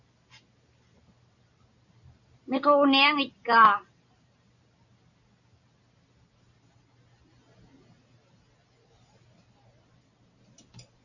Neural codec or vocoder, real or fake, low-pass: none; real; 7.2 kHz